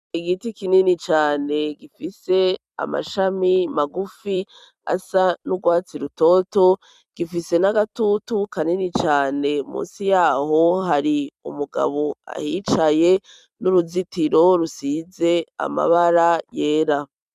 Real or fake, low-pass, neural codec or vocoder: fake; 14.4 kHz; vocoder, 44.1 kHz, 128 mel bands every 256 samples, BigVGAN v2